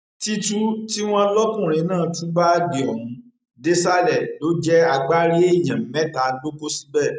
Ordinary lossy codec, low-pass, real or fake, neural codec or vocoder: none; none; real; none